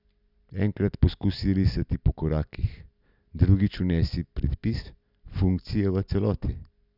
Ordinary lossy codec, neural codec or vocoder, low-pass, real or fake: none; none; 5.4 kHz; real